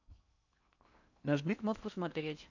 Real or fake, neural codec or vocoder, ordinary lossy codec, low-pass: fake; codec, 16 kHz in and 24 kHz out, 0.8 kbps, FocalCodec, streaming, 65536 codes; none; 7.2 kHz